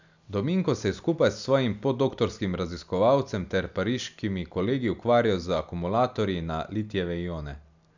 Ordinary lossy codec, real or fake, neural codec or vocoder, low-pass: none; real; none; 7.2 kHz